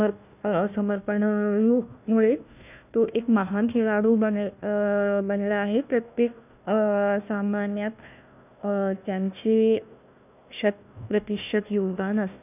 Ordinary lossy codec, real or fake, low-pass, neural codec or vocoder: none; fake; 3.6 kHz; codec, 16 kHz, 1 kbps, FunCodec, trained on Chinese and English, 50 frames a second